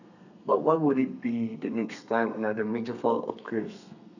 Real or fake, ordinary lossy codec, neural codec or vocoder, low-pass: fake; none; codec, 32 kHz, 1.9 kbps, SNAC; 7.2 kHz